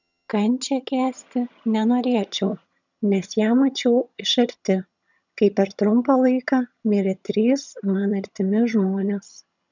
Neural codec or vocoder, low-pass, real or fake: vocoder, 22.05 kHz, 80 mel bands, HiFi-GAN; 7.2 kHz; fake